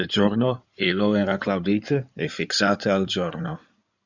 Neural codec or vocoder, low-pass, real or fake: codec, 16 kHz in and 24 kHz out, 2.2 kbps, FireRedTTS-2 codec; 7.2 kHz; fake